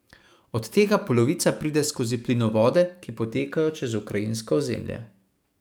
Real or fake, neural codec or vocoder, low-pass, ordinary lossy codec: fake; codec, 44.1 kHz, 7.8 kbps, DAC; none; none